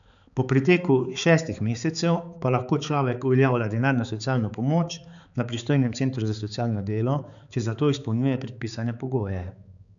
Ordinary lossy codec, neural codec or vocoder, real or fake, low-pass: none; codec, 16 kHz, 4 kbps, X-Codec, HuBERT features, trained on balanced general audio; fake; 7.2 kHz